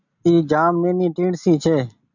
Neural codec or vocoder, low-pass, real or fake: none; 7.2 kHz; real